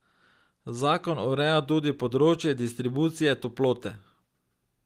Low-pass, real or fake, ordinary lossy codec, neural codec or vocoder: 14.4 kHz; real; Opus, 24 kbps; none